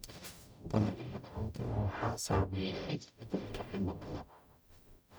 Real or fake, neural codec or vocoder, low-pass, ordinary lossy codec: fake; codec, 44.1 kHz, 0.9 kbps, DAC; none; none